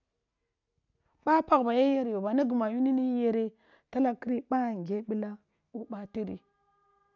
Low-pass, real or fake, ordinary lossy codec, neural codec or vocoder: 7.2 kHz; real; none; none